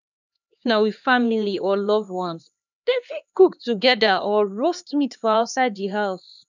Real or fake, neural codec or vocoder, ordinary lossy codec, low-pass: fake; codec, 16 kHz, 2 kbps, X-Codec, HuBERT features, trained on LibriSpeech; none; 7.2 kHz